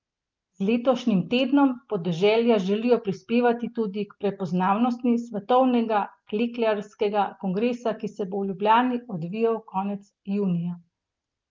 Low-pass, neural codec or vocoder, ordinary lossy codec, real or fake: 7.2 kHz; none; Opus, 32 kbps; real